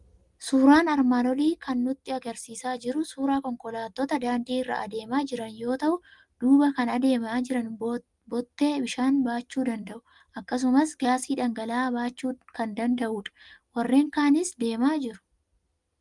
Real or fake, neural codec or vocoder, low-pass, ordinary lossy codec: real; none; 10.8 kHz; Opus, 32 kbps